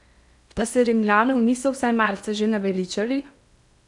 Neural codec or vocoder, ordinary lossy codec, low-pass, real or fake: codec, 16 kHz in and 24 kHz out, 0.8 kbps, FocalCodec, streaming, 65536 codes; none; 10.8 kHz; fake